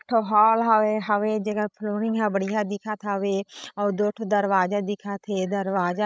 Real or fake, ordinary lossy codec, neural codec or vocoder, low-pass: fake; none; codec, 16 kHz, 16 kbps, FreqCodec, larger model; none